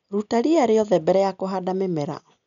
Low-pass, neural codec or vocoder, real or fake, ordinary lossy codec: 7.2 kHz; none; real; none